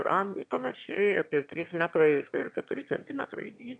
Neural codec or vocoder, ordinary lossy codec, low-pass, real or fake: autoencoder, 22.05 kHz, a latent of 192 numbers a frame, VITS, trained on one speaker; AAC, 64 kbps; 9.9 kHz; fake